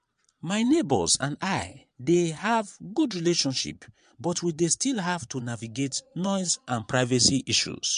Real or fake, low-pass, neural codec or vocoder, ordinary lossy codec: fake; 9.9 kHz; vocoder, 22.05 kHz, 80 mel bands, Vocos; MP3, 64 kbps